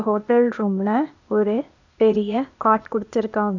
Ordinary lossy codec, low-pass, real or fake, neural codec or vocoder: none; 7.2 kHz; fake; codec, 16 kHz, 0.8 kbps, ZipCodec